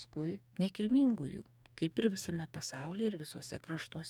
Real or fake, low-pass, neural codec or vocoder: fake; 19.8 kHz; codec, 44.1 kHz, 2.6 kbps, DAC